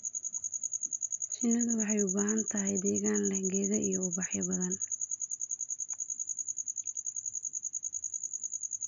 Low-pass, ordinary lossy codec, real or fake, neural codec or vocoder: 7.2 kHz; none; real; none